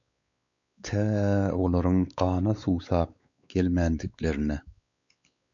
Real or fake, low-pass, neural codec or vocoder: fake; 7.2 kHz; codec, 16 kHz, 4 kbps, X-Codec, WavLM features, trained on Multilingual LibriSpeech